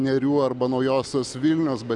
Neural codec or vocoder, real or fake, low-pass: none; real; 10.8 kHz